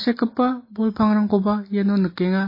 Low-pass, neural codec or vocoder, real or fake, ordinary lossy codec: 5.4 kHz; none; real; MP3, 24 kbps